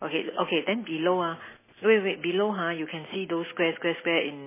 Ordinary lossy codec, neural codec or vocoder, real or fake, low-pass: MP3, 16 kbps; none; real; 3.6 kHz